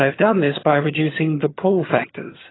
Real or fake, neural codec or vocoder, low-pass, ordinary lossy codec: fake; vocoder, 22.05 kHz, 80 mel bands, HiFi-GAN; 7.2 kHz; AAC, 16 kbps